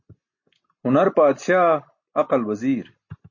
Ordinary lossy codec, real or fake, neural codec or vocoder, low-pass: MP3, 32 kbps; real; none; 7.2 kHz